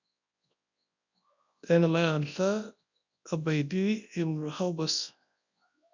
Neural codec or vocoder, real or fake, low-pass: codec, 24 kHz, 0.9 kbps, WavTokenizer, large speech release; fake; 7.2 kHz